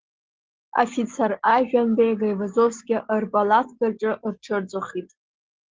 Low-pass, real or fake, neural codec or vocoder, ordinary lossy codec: 7.2 kHz; real; none; Opus, 16 kbps